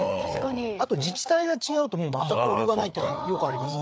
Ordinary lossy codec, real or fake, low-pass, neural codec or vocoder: none; fake; none; codec, 16 kHz, 4 kbps, FreqCodec, larger model